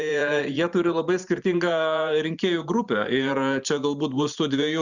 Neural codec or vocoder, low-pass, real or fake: vocoder, 44.1 kHz, 128 mel bands every 512 samples, BigVGAN v2; 7.2 kHz; fake